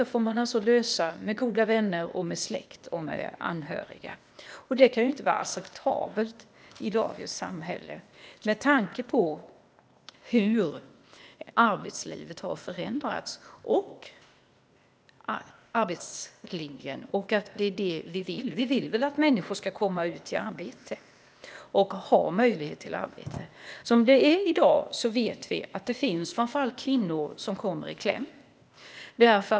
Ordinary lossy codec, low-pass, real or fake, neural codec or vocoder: none; none; fake; codec, 16 kHz, 0.8 kbps, ZipCodec